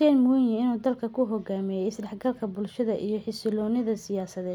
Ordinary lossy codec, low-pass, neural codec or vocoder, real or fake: none; 19.8 kHz; none; real